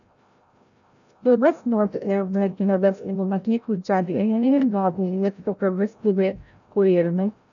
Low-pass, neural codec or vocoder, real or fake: 7.2 kHz; codec, 16 kHz, 0.5 kbps, FreqCodec, larger model; fake